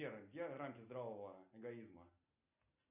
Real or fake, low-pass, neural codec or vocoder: real; 3.6 kHz; none